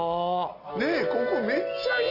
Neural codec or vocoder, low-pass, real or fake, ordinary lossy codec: none; 5.4 kHz; real; none